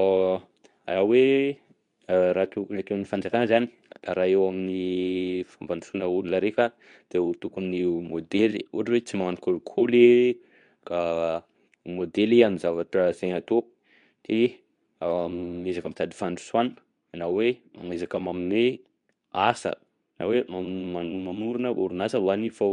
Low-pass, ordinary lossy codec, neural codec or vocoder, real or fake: 10.8 kHz; none; codec, 24 kHz, 0.9 kbps, WavTokenizer, medium speech release version 2; fake